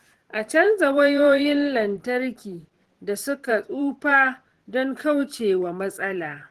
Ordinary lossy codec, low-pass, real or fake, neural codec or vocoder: Opus, 16 kbps; 14.4 kHz; fake; vocoder, 44.1 kHz, 128 mel bands every 512 samples, BigVGAN v2